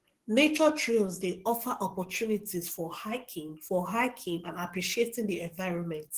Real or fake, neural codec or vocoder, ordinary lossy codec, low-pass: fake; codec, 44.1 kHz, 7.8 kbps, DAC; Opus, 16 kbps; 14.4 kHz